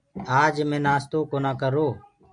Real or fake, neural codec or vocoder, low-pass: real; none; 9.9 kHz